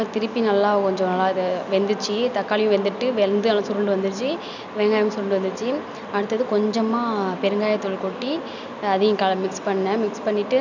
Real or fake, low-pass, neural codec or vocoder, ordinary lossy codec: real; 7.2 kHz; none; none